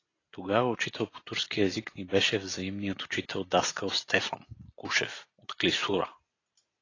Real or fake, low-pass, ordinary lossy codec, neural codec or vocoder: real; 7.2 kHz; AAC, 32 kbps; none